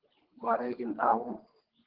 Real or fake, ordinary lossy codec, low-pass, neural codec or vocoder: fake; Opus, 16 kbps; 5.4 kHz; codec, 24 kHz, 3 kbps, HILCodec